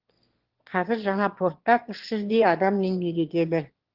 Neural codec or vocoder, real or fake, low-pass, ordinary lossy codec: autoencoder, 22.05 kHz, a latent of 192 numbers a frame, VITS, trained on one speaker; fake; 5.4 kHz; Opus, 16 kbps